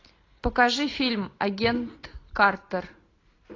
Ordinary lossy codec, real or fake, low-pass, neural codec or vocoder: AAC, 32 kbps; real; 7.2 kHz; none